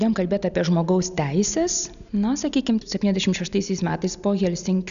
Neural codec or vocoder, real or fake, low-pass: none; real; 7.2 kHz